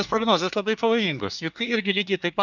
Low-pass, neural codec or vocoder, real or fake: 7.2 kHz; codec, 24 kHz, 1 kbps, SNAC; fake